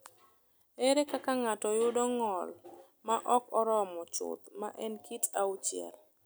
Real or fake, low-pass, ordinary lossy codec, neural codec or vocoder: real; none; none; none